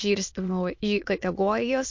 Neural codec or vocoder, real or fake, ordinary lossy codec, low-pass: autoencoder, 22.05 kHz, a latent of 192 numbers a frame, VITS, trained on many speakers; fake; MP3, 48 kbps; 7.2 kHz